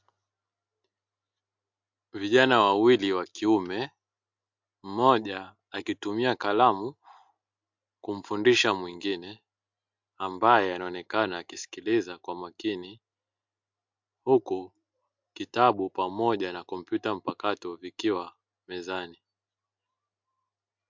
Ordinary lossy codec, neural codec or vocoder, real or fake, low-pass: MP3, 64 kbps; none; real; 7.2 kHz